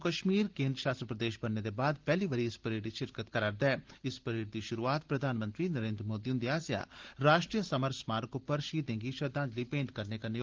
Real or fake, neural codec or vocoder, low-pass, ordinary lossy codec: real; none; 7.2 kHz; Opus, 16 kbps